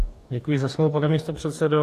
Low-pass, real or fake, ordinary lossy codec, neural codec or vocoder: 14.4 kHz; fake; AAC, 64 kbps; codec, 44.1 kHz, 2.6 kbps, DAC